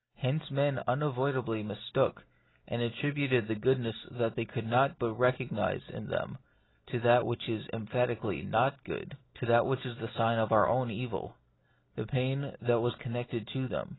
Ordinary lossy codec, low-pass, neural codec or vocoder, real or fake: AAC, 16 kbps; 7.2 kHz; none; real